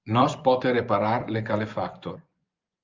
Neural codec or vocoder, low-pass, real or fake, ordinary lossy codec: vocoder, 24 kHz, 100 mel bands, Vocos; 7.2 kHz; fake; Opus, 24 kbps